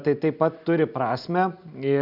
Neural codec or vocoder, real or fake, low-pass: none; real; 5.4 kHz